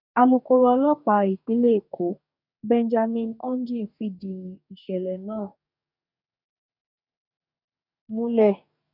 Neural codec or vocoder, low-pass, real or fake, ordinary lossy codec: codec, 44.1 kHz, 2.6 kbps, DAC; 5.4 kHz; fake; none